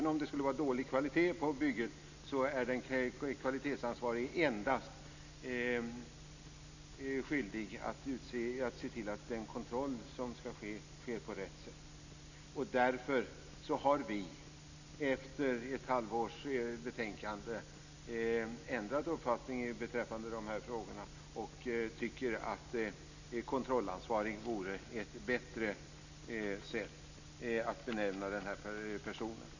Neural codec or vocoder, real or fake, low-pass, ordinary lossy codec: none; real; 7.2 kHz; none